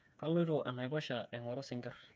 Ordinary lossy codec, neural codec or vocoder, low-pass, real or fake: none; codec, 16 kHz, 4 kbps, FreqCodec, smaller model; none; fake